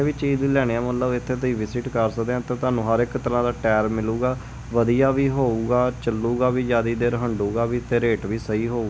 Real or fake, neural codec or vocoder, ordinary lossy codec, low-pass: real; none; none; none